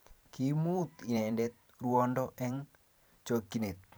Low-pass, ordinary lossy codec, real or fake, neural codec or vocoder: none; none; fake; vocoder, 44.1 kHz, 128 mel bands every 512 samples, BigVGAN v2